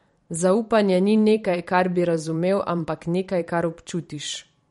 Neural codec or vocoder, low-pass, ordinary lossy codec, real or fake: vocoder, 44.1 kHz, 128 mel bands every 512 samples, BigVGAN v2; 19.8 kHz; MP3, 48 kbps; fake